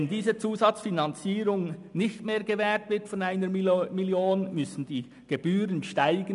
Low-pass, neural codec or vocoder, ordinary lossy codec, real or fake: 10.8 kHz; none; none; real